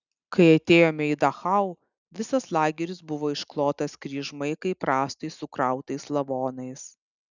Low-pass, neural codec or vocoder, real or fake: 7.2 kHz; none; real